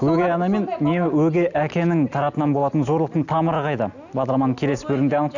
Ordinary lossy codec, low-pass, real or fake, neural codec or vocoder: none; 7.2 kHz; real; none